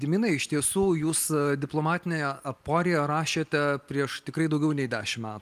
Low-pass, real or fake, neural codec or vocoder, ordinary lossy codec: 14.4 kHz; real; none; Opus, 32 kbps